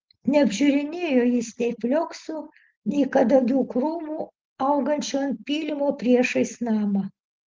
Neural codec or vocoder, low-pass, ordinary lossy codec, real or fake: none; 7.2 kHz; Opus, 16 kbps; real